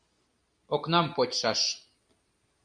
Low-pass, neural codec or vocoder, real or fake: 9.9 kHz; none; real